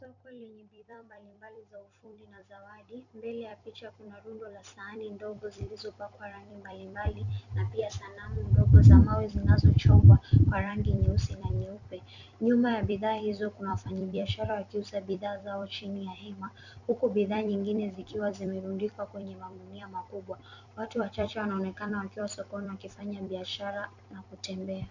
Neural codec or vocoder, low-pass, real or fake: vocoder, 44.1 kHz, 128 mel bands every 256 samples, BigVGAN v2; 7.2 kHz; fake